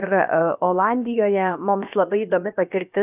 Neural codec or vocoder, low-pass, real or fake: codec, 16 kHz, about 1 kbps, DyCAST, with the encoder's durations; 3.6 kHz; fake